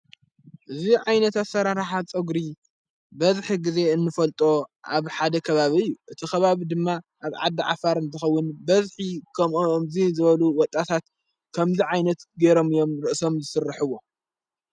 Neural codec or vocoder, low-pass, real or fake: none; 9.9 kHz; real